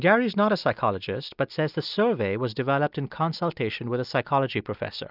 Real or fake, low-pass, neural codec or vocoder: real; 5.4 kHz; none